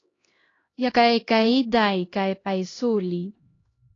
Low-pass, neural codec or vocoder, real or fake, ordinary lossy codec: 7.2 kHz; codec, 16 kHz, 1 kbps, X-Codec, HuBERT features, trained on LibriSpeech; fake; AAC, 32 kbps